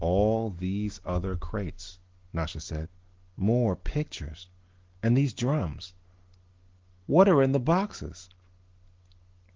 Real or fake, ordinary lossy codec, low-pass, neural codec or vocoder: real; Opus, 16 kbps; 7.2 kHz; none